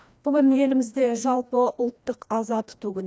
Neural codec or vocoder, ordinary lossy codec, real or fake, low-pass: codec, 16 kHz, 1 kbps, FreqCodec, larger model; none; fake; none